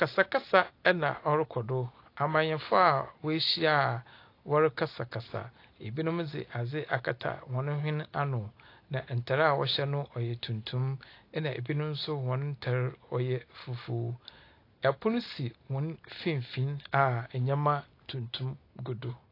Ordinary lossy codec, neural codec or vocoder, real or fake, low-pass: AAC, 32 kbps; none; real; 5.4 kHz